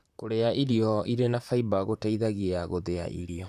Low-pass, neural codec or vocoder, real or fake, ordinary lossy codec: 14.4 kHz; vocoder, 44.1 kHz, 128 mel bands, Pupu-Vocoder; fake; none